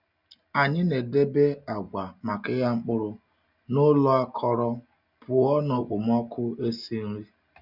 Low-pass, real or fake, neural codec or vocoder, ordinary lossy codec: 5.4 kHz; real; none; none